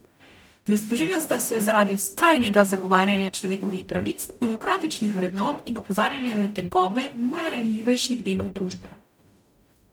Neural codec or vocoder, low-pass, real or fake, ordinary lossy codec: codec, 44.1 kHz, 0.9 kbps, DAC; none; fake; none